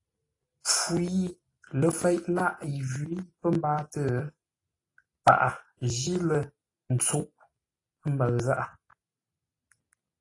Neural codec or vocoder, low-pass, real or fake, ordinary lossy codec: none; 10.8 kHz; real; AAC, 32 kbps